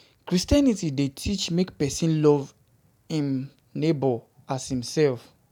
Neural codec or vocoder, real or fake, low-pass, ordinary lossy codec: none; real; 19.8 kHz; none